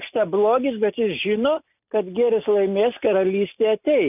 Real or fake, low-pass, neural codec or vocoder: real; 3.6 kHz; none